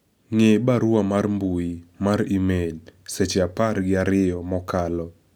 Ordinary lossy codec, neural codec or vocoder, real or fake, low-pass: none; none; real; none